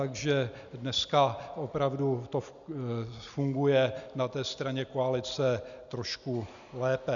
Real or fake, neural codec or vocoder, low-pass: real; none; 7.2 kHz